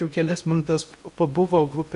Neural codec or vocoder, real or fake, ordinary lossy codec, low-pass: codec, 16 kHz in and 24 kHz out, 0.8 kbps, FocalCodec, streaming, 65536 codes; fake; AAC, 96 kbps; 10.8 kHz